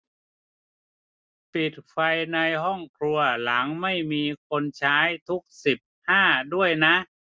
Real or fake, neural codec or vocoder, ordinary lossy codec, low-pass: real; none; none; none